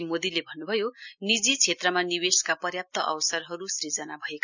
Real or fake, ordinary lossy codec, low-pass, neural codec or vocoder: real; none; 7.2 kHz; none